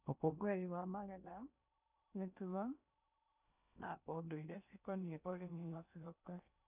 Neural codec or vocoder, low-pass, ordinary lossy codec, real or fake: codec, 16 kHz in and 24 kHz out, 0.8 kbps, FocalCodec, streaming, 65536 codes; 3.6 kHz; none; fake